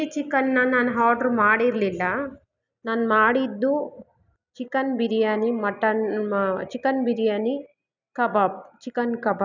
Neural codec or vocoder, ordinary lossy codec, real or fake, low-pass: none; none; real; 7.2 kHz